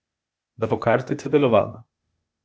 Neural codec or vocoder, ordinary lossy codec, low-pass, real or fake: codec, 16 kHz, 0.8 kbps, ZipCodec; none; none; fake